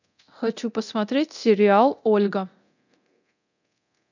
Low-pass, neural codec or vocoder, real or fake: 7.2 kHz; codec, 24 kHz, 0.9 kbps, DualCodec; fake